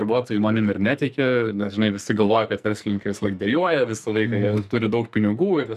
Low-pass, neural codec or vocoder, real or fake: 14.4 kHz; codec, 44.1 kHz, 2.6 kbps, SNAC; fake